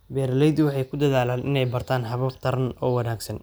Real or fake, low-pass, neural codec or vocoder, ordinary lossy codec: real; none; none; none